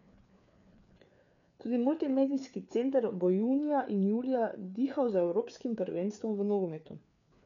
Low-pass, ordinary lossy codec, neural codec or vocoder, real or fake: 7.2 kHz; none; codec, 16 kHz, 4 kbps, FreqCodec, larger model; fake